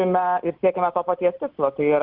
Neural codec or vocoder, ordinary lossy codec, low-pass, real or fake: none; Opus, 24 kbps; 5.4 kHz; real